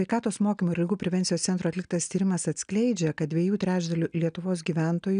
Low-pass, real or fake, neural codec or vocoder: 9.9 kHz; real; none